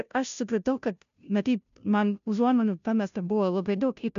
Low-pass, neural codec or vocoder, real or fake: 7.2 kHz; codec, 16 kHz, 0.5 kbps, FunCodec, trained on Chinese and English, 25 frames a second; fake